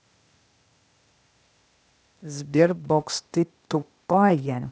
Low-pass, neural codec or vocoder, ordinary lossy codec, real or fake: none; codec, 16 kHz, 0.8 kbps, ZipCodec; none; fake